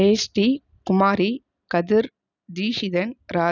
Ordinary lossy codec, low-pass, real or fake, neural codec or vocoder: none; 7.2 kHz; real; none